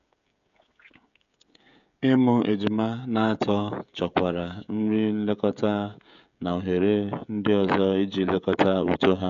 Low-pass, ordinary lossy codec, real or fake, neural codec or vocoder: 7.2 kHz; none; fake; codec, 16 kHz, 16 kbps, FreqCodec, smaller model